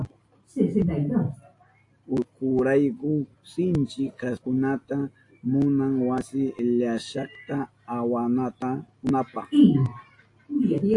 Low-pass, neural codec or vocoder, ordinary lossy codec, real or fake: 10.8 kHz; none; AAC, 48 kbps; real